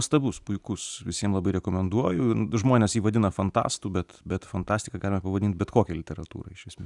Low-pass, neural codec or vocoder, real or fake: 10.8 kHz; vocoder, 44.1 kHz, 128 mel bands every 256 samples, BigVGAN v2; fake